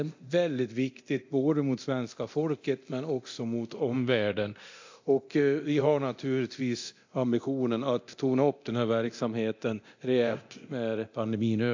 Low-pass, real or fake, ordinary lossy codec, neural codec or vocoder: 7.2 kHz; fake; AAC, 48 kbps; codec, 24 kHz, 0.9 kbps, DualCodec